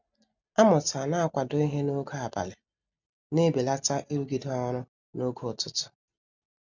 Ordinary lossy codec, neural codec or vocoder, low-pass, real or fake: none; none; 7.2 kHz; real